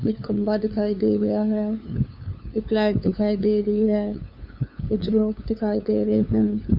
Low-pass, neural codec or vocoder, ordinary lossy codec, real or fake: 5.4 kHz; codec, 16 kHz, 2 kbps, FunCodec, trained on LibriTTS, 25 frames a second; none; fake